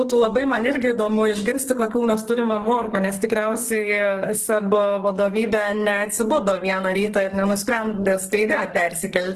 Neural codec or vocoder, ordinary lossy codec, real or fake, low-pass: codec, 44.1 kHz, 2.6 kbps, SNAC; Opus, 16 kbps; fake; 14.4 kHz